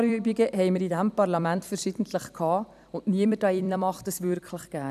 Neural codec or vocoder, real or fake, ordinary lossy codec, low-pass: vocoder, 44.1 kHz, 128 mel bands every 256 samples, BigVGAN v2; fake; none; 14.4 kHz